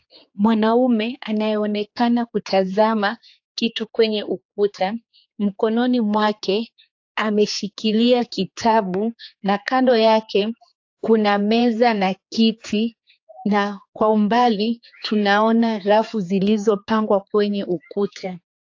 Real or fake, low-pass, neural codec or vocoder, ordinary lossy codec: fake; 7.2 kHz; codec, 16 kHz, 4 kbps, X-Codec, HuBERT features, trained on general audio; AAC, 48 kbps